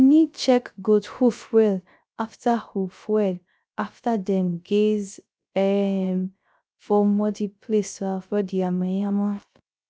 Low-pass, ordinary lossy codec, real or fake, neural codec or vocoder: none; none; fake; codec, 16 kHz, 0.3 kbps, FocalCodec